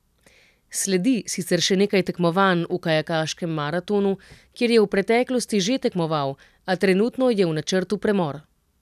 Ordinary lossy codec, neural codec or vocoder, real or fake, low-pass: none; none; real; 14.4 kHz